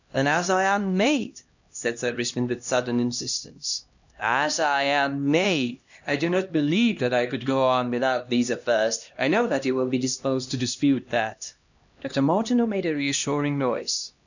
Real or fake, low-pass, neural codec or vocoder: fake; 7.2 kHz; codec, 16 kHz, 1 kbps, X-Codec, HuBERT features, trained on LibriSpeech